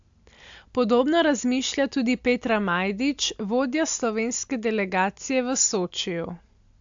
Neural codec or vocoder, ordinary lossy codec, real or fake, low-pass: none; AAC, 64 kbps; real; 7.2 kHz